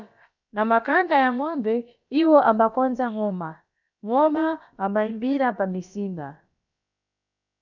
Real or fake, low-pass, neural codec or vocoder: fake; 7.2 kHz; codec, 16 kHz, about 1 kbps, DyCAST, with the encoder's durations